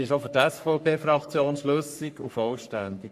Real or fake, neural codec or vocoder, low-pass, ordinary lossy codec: fake; codec, 44.1 kHz, 3.4 kbps, Pupu-Codec; 14.4 kHz; AAC, 96 kbps